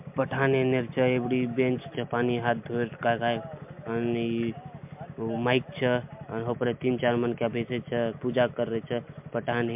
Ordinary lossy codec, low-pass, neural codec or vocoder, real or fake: MP3, 32 kbps; 3.6 kHz; none; real